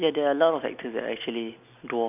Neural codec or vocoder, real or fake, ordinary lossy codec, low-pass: none; real; AAC, 32 kbps; 3.6 kHz